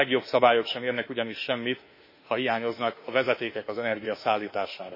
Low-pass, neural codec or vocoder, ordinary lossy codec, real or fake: 5.4 kHz; autoencoder, 48 kHz, 32 numbers a frame, DAC-VAE, trained on Japanese speech; MP3, 24 kbps; fake